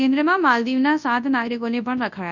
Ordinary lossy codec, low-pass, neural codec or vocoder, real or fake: none; 7.2 kHz; codec, 24 kHz, 0.9 kbps, WavTokenizer, large speech release; fake